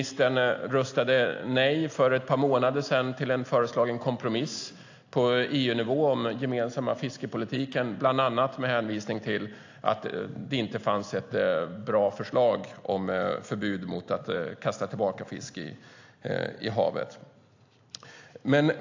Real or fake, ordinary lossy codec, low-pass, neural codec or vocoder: real; AAC, 48 kbps; 7.2 kHz; none